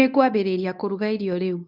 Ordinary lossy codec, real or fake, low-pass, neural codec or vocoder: none; fake; 5.4 kHz; codec, 24 kHz, 0.9 kbps, WavTokenizer, medium speech release version 1